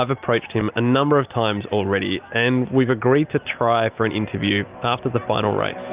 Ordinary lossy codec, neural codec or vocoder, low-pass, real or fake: Opus, 24 kbps; none; 3.6 kHz; real